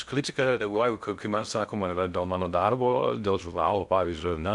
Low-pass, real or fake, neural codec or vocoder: 10.8 kHz; fake; codec, 16 kHz in and 24 kHz out, 0.6 kbps, FocalCodec, streaming, 2048 codes